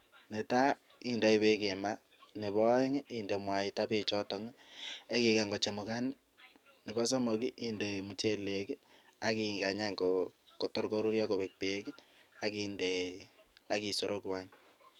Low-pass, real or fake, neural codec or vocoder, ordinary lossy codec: 19.8 kHz; fake; codec, 44.1 kHz, 7.8 kbps, DAC; none